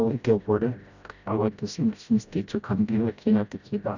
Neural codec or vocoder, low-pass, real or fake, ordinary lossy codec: codec, 16 kHz, 0.5 kbps, FreqCodec, smaller model; 7.2 kHz; fake; none